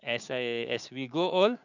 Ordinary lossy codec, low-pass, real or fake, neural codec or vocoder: none; 7.2 kHz; fake; codec, 16 kHz, 6 kbps, DAC